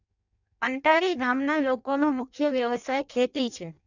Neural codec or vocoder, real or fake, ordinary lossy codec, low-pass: codec, 16 kHz in and 24 kHz out, 0.6 kbps, FireRedTTS-2 codec; fake; none; 7.2 kHz